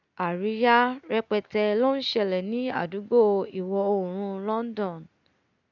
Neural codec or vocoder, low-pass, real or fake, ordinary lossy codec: none; 7.2 kHz; real; none